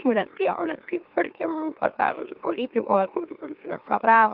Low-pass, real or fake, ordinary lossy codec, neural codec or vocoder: 5.4 kHz; fake; Opus, 32 kbps; autoencoder, 44.1 kHz, a latent of 192 numbers a frame, MeloTTS